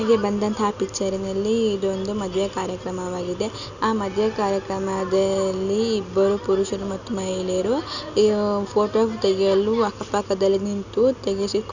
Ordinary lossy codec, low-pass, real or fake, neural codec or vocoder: none; 7.2 kHz; real; none